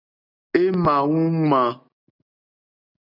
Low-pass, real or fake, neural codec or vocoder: 5.4 kHz; real; none